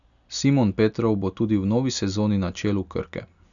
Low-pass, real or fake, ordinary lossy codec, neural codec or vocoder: 7.2 kHz; real; none; none